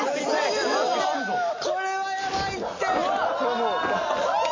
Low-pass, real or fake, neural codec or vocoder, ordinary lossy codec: 7.2 kHz; real; none; MP3, 32 kbps